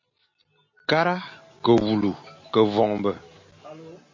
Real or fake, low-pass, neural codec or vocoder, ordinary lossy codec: real; 7.2 kHz; none; MP3, 32 kbps